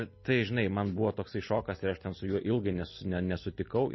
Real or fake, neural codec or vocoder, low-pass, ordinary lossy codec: real; none; 7.2 kHz; MP3, 24 kbps